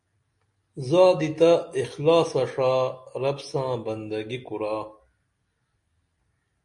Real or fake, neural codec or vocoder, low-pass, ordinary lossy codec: real; none; 10.8 kHz; MP3, 48 kbps